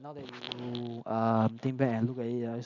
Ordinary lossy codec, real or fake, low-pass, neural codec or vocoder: none; real; 7.2 kHz; none